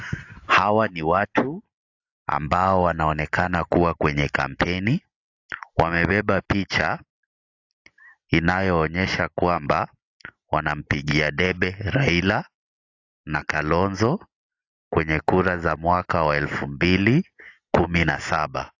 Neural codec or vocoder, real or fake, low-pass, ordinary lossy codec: none; real; 7.2 kHz; AAC, 48 kbps